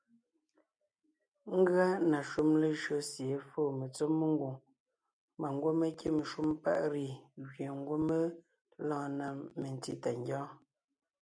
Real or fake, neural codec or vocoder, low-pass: real; none; 9.9 kHz